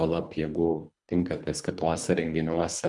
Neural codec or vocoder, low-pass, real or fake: codec, 24 kHz, 3 kbps, HILCodec; 10.8 kHz; fake